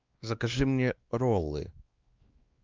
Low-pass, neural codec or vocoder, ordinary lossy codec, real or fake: 7.2 kHz; codec, 16 kHz, 2 kbps, X-Codec, WavLM features, trained on Multilingual LibriSpeech; Opus, 24 kbps; fake